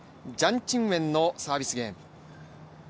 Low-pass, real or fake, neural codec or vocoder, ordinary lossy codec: none; real; none; none